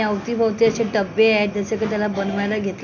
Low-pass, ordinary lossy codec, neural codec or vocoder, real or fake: 7.2 kHz; none; none; real